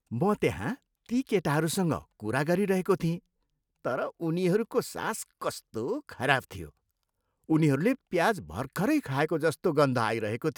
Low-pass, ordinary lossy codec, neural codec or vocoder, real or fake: none; none; none; real